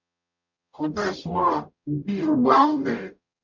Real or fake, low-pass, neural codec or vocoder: fake; 7.2 kHz; codec, 44.1 kHz, 0.9 kbps, DAC